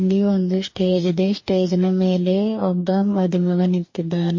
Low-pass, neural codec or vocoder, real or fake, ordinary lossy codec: 7.2 kHz; codec, 44.1 kHz, 2.6 kbps, DAC; fake; MP3, 32 kbps